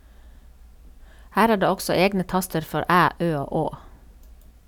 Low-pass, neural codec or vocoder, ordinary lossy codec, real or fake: 19.8 kHz; none; none; real